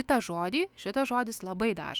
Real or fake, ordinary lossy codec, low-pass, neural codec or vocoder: fake; Opus, 64 kbps; 19.8 kHz; autoencoder, 48 kHz, 128 numbers a frame, DAC-VAE, trained on Japanese speech